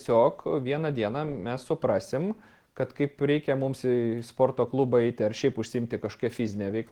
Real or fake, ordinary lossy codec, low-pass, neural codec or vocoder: real; Opus, 16 kbps; 19.8 kHz; none